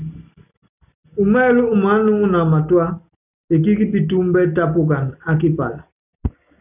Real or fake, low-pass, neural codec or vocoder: real; 3.6 kHz; none